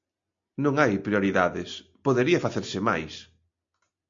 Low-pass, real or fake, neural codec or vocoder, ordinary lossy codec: 7.2 kHz; real; none; MP3, 48 kbps